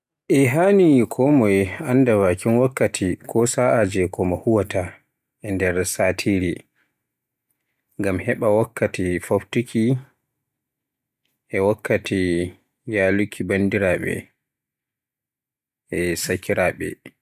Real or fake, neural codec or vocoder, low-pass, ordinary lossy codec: real; none; 14.4 kHz; none